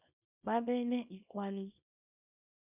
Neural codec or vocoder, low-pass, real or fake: codec, 24 kHz, 0.9 kbps, WavTokenizer, small release; 3.6 kHz; fake